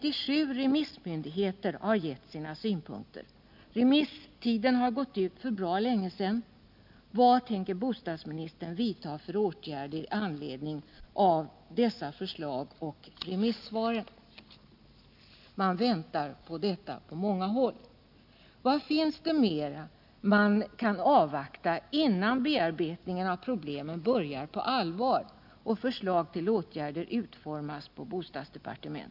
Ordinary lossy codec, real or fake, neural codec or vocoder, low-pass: none; real; none; 5.4 kHz